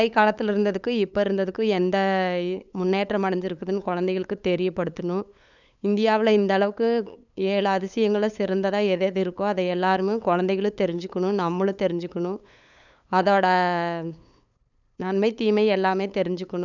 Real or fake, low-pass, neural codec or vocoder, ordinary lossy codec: fake; 7.2 kHz; codec, 16 kHz, 8 kbps, FunCodec, trained on LibriTTS, 25 frames a second; none